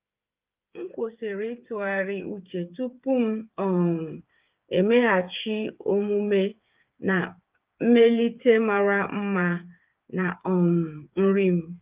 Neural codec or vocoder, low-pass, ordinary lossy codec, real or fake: codec, 16 kHz, 8 kbps, FreqCodec, smaller model; 3.6 kHz; Opus, 24 kbps; fake